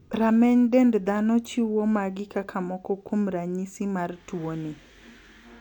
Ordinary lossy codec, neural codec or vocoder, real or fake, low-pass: none; none; real; 19.8 kHz